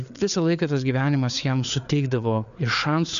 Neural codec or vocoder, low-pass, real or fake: codec, 16 kHz, 4 kbps, FreqCodec, larger model; 7.2 kHz; fake